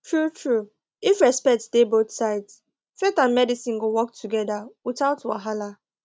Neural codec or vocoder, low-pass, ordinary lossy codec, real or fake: none; none; none; real